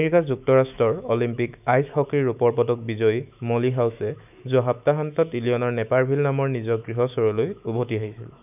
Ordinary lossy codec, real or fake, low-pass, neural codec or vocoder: none; fake; 3.6 kHz; autoencoder, 48 kHz, 128 numbers a frame, DAC-VAE, trained on Japanese speech